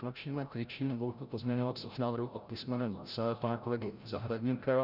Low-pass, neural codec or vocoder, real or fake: 5.4 kHz; codec, 16 kHz, 0.5 kbps, FreqCodec, larger model; fake